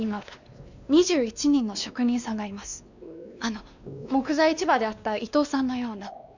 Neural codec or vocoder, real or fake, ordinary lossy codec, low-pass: codec, 16 kHz, 2 kbps, X-Codec, WavLM features, trained on Multilingual LibriSpeech; fake; none; 7.2 kHz